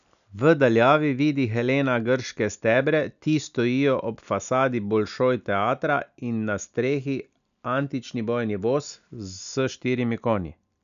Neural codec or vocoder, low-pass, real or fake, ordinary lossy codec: none; 7.2 kHz; real; none